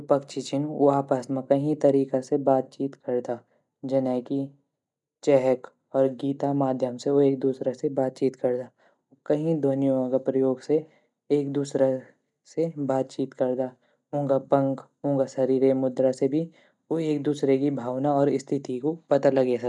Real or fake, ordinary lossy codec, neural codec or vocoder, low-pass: real; none; none; 10.8 kHz